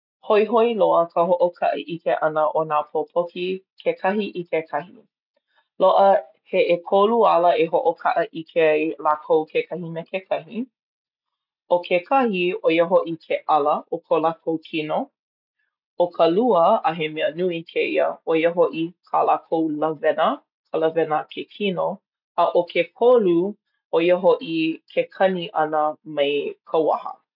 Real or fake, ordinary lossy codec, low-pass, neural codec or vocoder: real; none; 5.4 kHz; none